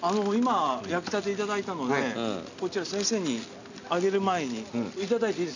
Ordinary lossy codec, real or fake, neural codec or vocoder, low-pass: none; real; none; 7.2 kHz